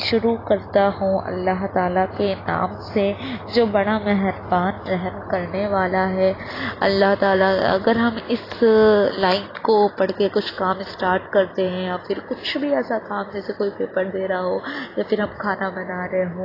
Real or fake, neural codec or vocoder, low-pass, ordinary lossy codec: real; none; 5.4 kHz; AAC, 24 kbps